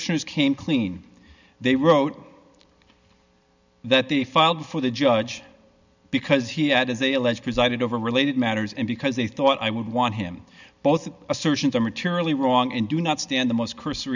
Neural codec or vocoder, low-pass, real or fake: none; 7.2 kHz; real